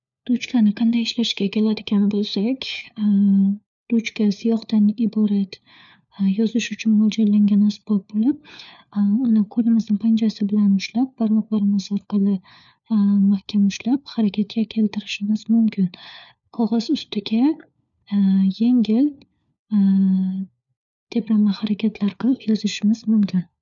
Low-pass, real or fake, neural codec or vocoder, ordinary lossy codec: 7.2 kHz; fake; codec, 16 kHz, 4 kbps, FunCodec, trained on LibriTTS, 50 frames a second; none